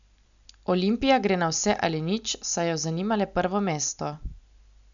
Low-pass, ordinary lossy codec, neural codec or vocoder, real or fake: 7.2 kHz; none; none; real